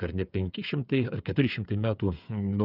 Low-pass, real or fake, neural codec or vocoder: 5.4 kHz; fake; codec, 16 kHz, 8 kbps, FreqCodec, smaller model